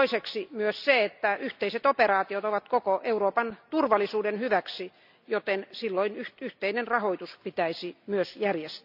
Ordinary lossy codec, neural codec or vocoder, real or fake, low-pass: none; none; real; 5.4 kHz